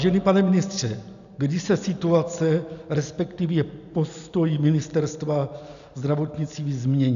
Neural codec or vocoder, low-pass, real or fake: none; 7.2 kHz; real